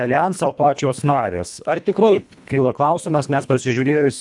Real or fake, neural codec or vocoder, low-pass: fake; codec, 24 kHz, 1.5 kbps, HILCodec; 10.8 kHz